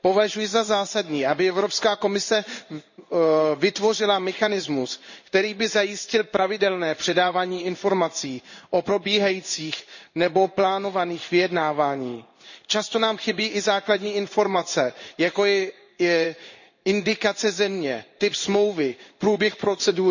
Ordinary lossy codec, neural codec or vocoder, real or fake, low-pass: none; codec, 16 kHz in and 24 kHz out, 1 kbps, XY-Tokenizer; fake; 7.2 kHz